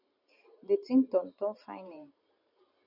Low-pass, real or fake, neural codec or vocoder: 5.4 kHz; fake; vocoder, 44.1 kHz, 128 mel bands every 512 samples, BigVGAN v2